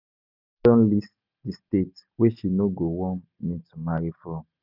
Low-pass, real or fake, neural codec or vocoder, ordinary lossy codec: 5.4 kHz; real; none; none